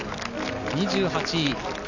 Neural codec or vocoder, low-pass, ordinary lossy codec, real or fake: none; 7.2 kHz; none; real